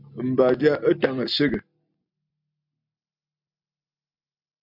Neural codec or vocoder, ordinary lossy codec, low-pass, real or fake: none; MP3, 48 kbps; 5.4 kHz; real